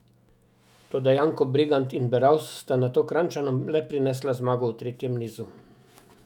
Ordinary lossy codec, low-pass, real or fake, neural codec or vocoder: none; 19.8 kHz; fake; autoencoder, 48 kHz, 128 numbers a frame, DAC-VAE, trained on Japanese speech